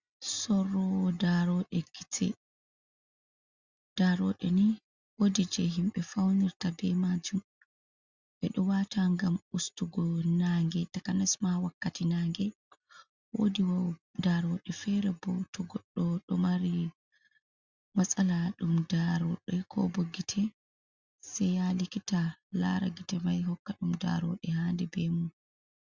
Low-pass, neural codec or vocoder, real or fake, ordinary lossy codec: 7.2 kHz; none; real; Opus, 64 kbps